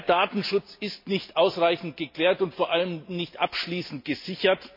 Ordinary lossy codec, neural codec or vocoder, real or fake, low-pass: MP3, 32 kbps; none; real; 5.4 kHz